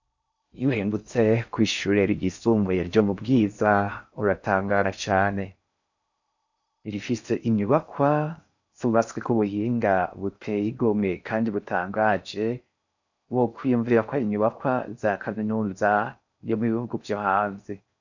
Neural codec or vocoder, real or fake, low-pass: codec, 16 kHz in and 24 kHz out, 0.6 kbps, FocalCodec, streaming, 4096 codes; fake; 7.2 kHz